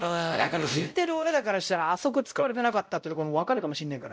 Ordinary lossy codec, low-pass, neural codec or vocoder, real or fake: none; none; codec, 16 kHz, 0.5 kbps, X-Codec, WavLM features, trained on Multilingual LibriSpeech; fake